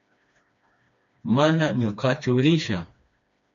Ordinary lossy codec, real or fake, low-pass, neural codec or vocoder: MP3, 64 kbps; fake; 7.2 kHz; codec, 16 kHz, 2 kbps, FreqCodec, smaller model